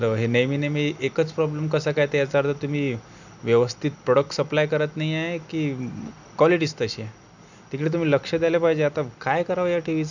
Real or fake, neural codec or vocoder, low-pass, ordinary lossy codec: real; none; 7.2 kHz; none